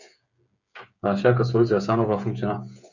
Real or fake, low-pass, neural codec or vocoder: fake; 7.2 kHz; codec, 16 kHz, 8 kbps, FreqCodec, smaller model